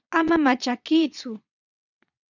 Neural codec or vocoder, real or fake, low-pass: vocoder, 22.05 kHz, 80 mel bands, WaveNeXt; fake; 7.2 kHz